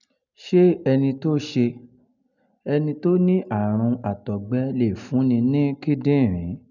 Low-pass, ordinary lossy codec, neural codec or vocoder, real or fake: 7.2 kHz; none; none; real